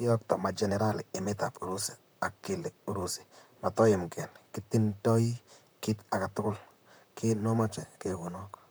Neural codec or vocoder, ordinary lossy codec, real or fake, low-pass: vocoder, 44.1 kHz, 128 mel bands, Pupu-Vocoder; none; fake; none